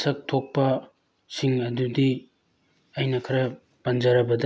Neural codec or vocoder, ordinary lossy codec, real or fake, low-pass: none; none; real; none